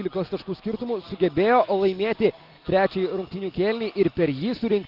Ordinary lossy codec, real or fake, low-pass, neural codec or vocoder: Opus, 32 kbps; real; 5.4 kHz; none